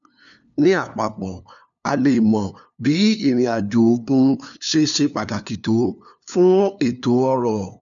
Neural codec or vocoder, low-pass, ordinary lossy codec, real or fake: codec, 16 kHz, 2 kbps, FunCodec, trained on LibriTTS, 25 frames a second; 7.2 kHz; none; fake